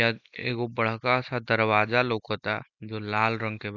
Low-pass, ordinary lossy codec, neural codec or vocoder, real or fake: 7.2 kHz; none; none; real